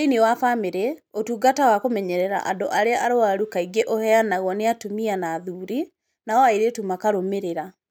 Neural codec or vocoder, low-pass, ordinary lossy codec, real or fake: none; none; none; real